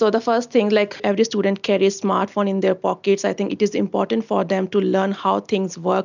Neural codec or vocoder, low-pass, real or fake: none; 7.2 kHz; real